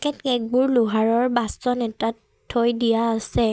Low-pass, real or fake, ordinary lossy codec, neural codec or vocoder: none; real; none; none